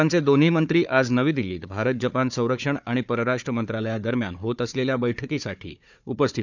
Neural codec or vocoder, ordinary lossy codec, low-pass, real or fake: codec, 16 kHz, 4 kbps, FunCodec, trained on Chinese and English, 50 frames a second; none; 7.2 kHz; fake